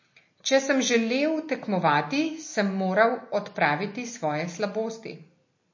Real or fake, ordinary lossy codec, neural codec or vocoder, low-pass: real; MP3, 32 kbps; none; 7.2 kHz